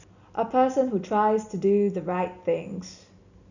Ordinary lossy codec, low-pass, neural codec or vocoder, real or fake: none; 7.2 kHz; none; real